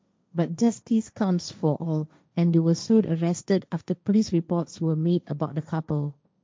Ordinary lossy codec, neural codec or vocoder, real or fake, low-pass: none; codec, 16 kHz, 1.1 kbps, Voila-Tokenizer; fake; none